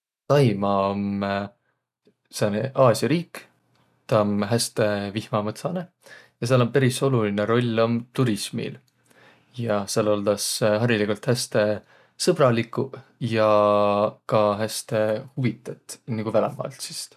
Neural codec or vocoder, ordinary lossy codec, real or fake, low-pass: none; none; real; 14.4 kHz